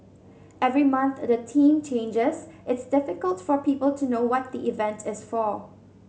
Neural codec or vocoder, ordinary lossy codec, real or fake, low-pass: none; none; real; none